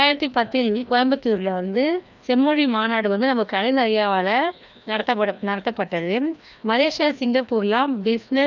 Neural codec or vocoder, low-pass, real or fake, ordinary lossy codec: codec, 16 kHz, 1 kbps, FreqCodec, larger model; 7.2 kHz; fake; none